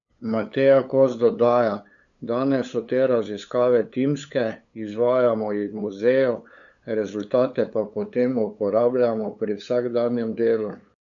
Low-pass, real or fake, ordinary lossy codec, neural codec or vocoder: 7.2 kHz; fake; none; codec, 16 kHz, 8 kbps, FunCodec, trained on LibriTTS, 25 frames a second